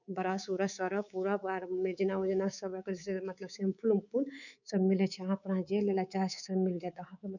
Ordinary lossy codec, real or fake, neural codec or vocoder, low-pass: none; fake; codec, 24 kHz, 3.1 kbps, DualCodec; 7.2 kHz